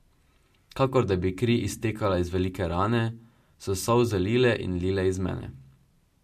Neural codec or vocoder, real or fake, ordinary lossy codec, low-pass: none; real; MP3, 64 kbps; 14.4 kHz